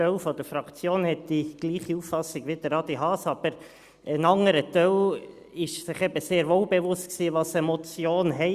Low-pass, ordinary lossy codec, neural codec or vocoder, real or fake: 14.4 kHz; Opus, 64 kbps; none; real